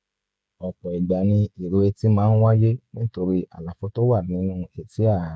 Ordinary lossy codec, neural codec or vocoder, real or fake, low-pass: none; codec, 16 kHz, 16 kbps, FreqCodec, smaller model; fake; none